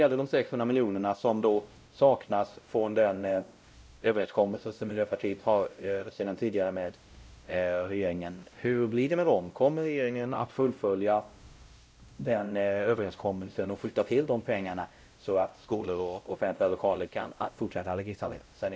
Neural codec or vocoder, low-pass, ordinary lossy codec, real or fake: codec, 16 kHz, 0.5 kbps, X-Codec, WavLM features, trained on Multilingual LibriSpeech; none; none; fake